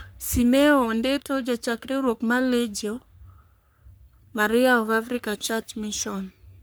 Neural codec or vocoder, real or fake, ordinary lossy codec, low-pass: codec, 44.1 kHz, 3.4 kbps, Pupu-Codec; fake; none; none